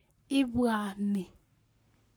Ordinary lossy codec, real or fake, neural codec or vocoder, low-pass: none; fake; codec, 44.1 kHz, 7.8 kbps, Pupu-Codec; none